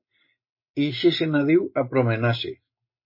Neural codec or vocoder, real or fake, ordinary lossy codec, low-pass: none; real; MP3, 24 kbps; 5.4 kHz